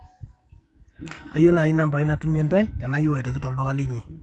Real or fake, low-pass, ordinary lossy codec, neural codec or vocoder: fake; 10.8 kHz; none; codec, 44.1 kHz, 2.6 kbps, SNAC